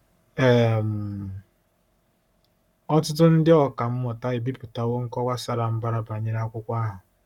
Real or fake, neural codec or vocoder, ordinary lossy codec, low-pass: fake; codec, 44.1 kHz, 7.8 kbps, Pupu-Codec; none; 19.8 kHz